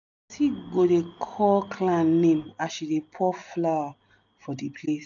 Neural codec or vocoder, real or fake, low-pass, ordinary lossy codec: none; real; 7.2 kHz; none